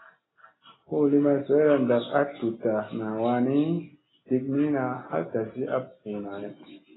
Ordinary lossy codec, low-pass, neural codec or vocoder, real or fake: AAC, 16 kbps; 7.2 kHz; none; real